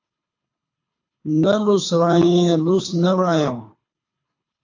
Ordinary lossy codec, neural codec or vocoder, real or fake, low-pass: AAC, 48 kbps; codec, 24 kHz, 3 kbps, HILCodec; fake; 7.2 kHz